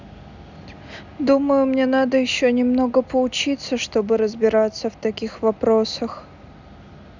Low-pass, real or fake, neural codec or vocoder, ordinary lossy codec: 7.2 kHz; real; none; none